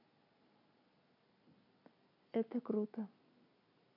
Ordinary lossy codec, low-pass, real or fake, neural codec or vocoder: MP3, 48 kbps; 5.4 kHz; real; none